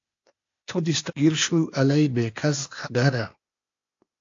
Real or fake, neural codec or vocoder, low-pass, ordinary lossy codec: fake; codec, 16 kHz, 0.8 kbps, ZipCodec; 7.2 kHz; AAC, 48 kbps